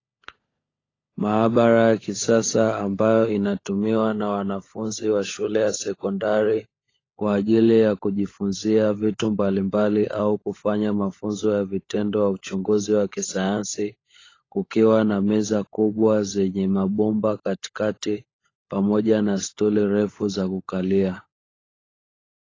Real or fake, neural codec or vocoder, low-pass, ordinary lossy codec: fake; codec, 16 kHz, 16 kbps, FunCodec, trained on LibriTTS, 50 frames a second; 7.2 kHz; AAC, 32 kbps